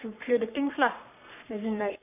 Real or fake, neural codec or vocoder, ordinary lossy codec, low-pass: fake; codec, 44.1 kHz, 3.4 kbps, Pupu-Codec; none; 3.6 kHz